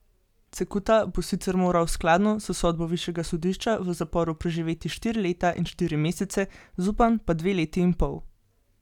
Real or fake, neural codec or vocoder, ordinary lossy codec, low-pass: real; none; none; 19.8 kHz